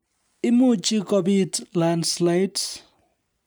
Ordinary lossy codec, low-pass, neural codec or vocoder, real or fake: none; none; none; real